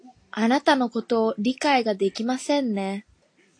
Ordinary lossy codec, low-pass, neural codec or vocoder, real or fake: AAC, 48 kbps; 9.9 kHz; none; real